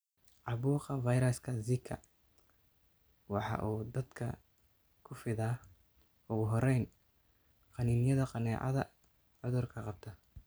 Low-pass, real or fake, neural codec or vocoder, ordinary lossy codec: none; real; none; none